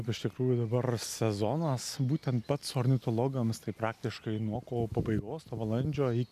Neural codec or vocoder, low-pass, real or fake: none; 14.4 kHz; real